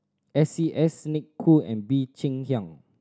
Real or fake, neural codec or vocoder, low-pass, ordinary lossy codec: real; none; none; none